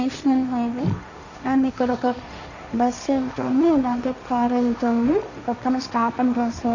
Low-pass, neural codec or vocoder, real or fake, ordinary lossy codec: 7.2 kHz; codec, 16 kHz, 1.1 kbps, Voila-Tokenizer; fake; none